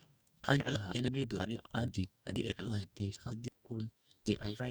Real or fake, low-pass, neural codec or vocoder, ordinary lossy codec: fake; none; codec, 44.1 kHz, 2.6 kbps, DAC; none